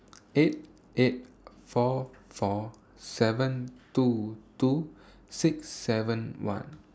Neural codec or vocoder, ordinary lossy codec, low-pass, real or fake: none; none; none; real